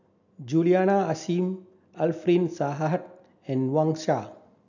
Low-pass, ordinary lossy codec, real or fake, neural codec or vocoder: 7.2 kHz; none; real; none